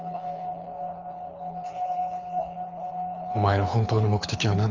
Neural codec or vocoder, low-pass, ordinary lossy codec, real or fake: codec, 24 kHz, 6 kbps, HILCodec; 7.2 kHz; Opus, 32 kbps; fake